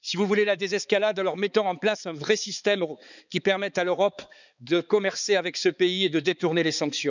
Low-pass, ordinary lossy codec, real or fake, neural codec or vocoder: 7.2 kHz; none; fake; codec, 16 kHz, 4 kbps, X-Codec, HuBERT features, trained on balanced general audio